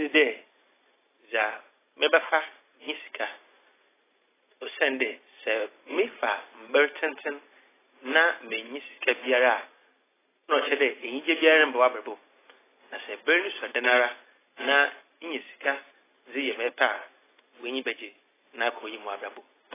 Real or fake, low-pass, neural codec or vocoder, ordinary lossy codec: real; 3.6 kHz; none; AAC, 16 kbps